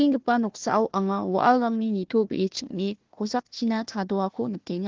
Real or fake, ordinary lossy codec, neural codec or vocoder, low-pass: fake; Opus, 16 kbps; codec, 16 kHz, 1 kbps, FunCodec, trained on Chinese and English, 50 frames a second; 7.2 kHz